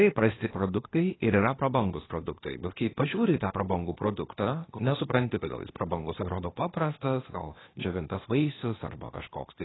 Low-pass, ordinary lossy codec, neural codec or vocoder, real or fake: 7.2 kHz; AAC, 16 kbps; codec, 16 kHz, 0.8 kbps, ZipCodec; fake